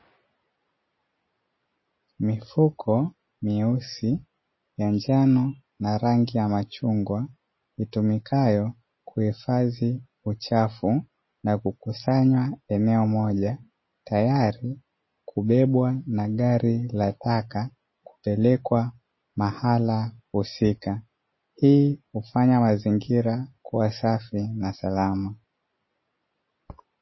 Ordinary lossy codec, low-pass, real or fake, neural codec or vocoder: MP3, 24 kbps; 7.2 kHz; real; none